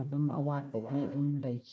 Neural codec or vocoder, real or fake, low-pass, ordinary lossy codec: codec, 16 kHz, 1 kbps, FunCodec, trained on Chinese and English, 50 frames a second; fake; none; none